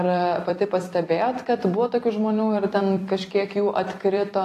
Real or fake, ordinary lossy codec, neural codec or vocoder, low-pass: fake; AAC, 48 kbps; vocoder, 44.1 kHz, 128 mel bands every 256 samples, BigVGAN v2; 14.4 kHz